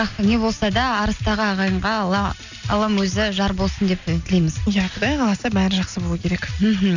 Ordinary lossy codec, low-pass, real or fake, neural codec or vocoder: none; 7.2 kHz; real; none